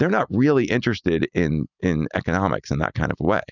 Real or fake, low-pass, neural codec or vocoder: real; 7.2 kHz; none